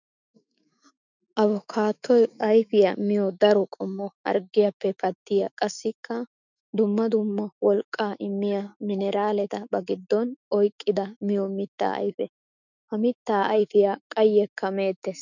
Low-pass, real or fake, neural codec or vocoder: 7.2 kHz; fake; autoencoder, 48 kHz, 128 numbers a frame, DAC-VAE, trained on Japanese speech